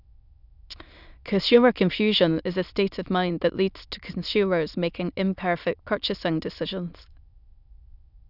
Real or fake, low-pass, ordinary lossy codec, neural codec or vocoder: fake; 5.4 kHz; none; autoencoder, 22.05 kHz, a latent of 192 numbers a frame, VITS, trained on many speakers